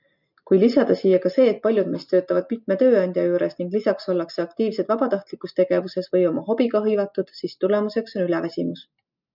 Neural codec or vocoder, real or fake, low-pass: none; real; 5.4 kHz